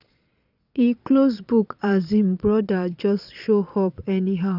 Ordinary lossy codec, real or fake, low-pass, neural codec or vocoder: none; fake; 5.4 kHz; vocoder, 22.05 kHz, 80 mel bands, Vocos